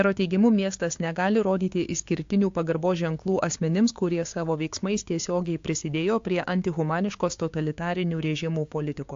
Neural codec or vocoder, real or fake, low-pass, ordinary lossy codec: codec, 16 kHz, 6 kbps, DAC; fake; 7.2 kHz; AAC, 48 kbps